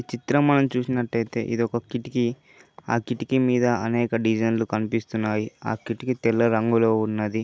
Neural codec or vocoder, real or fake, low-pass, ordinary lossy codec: none; real; none; none